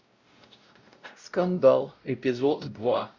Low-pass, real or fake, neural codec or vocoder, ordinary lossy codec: 7.2 kHz; fake; codec, 16 kHz, 0.5 kbps, X-Codec, WavLM features, trained on Multilingual LibriSpeech; Opus, 64 kbps